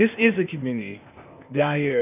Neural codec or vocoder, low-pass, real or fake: codec, 16 kHz, 0.8 kbps, ZipCodec; 3.6 kHz; fake